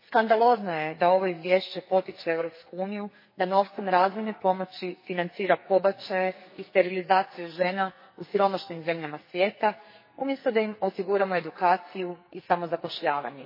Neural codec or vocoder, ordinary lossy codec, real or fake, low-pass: codec, 44.1 kHz, 2.6 kbps, SNAC; MP3, 24 kbps; fake; 5.4 kHz